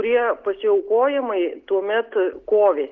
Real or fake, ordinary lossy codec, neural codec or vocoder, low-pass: real; Opus, 24 kbps; none; 7.2 kHz